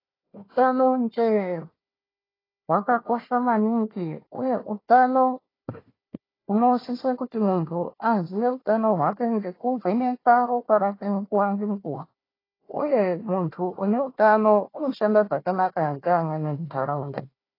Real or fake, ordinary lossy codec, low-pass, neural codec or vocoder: fake; AAC, 24 kbps; 5.4 kHz; codec, 16 kHz, 1 kbps, FunCodec, trained on Chinese and English, 50 frames a second